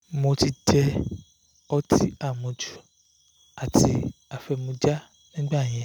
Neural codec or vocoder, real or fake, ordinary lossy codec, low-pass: none; real; none; 19.8 kHz